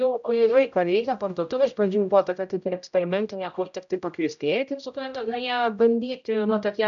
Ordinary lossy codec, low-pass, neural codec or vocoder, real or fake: MP3, 96 kbps; 7.2 kHz; codec, 16 kHz, 0.5 kbps, X-Codec, HuBERT features, trained on general audio; fake